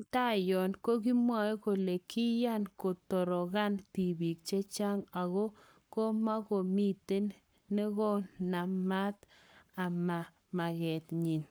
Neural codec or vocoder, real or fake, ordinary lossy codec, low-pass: codec, 44.1 kHz, 7.8 kbps, Pupu-Codec; fake; none; none